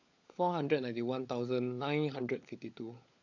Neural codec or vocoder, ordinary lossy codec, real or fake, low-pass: codec, 16 kHz, 16 kbps, FunCodec, trained on LibriTTS, 50 frames a second; AAC, 48 kbps; fake; 7.2 kHz